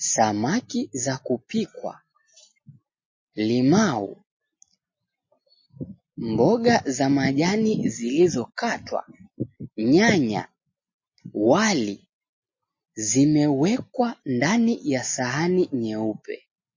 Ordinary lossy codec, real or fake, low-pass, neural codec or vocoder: MP3, 32 kbps; real; 7.2 kHz; none